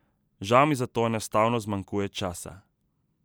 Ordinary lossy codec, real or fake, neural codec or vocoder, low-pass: none; real; none; none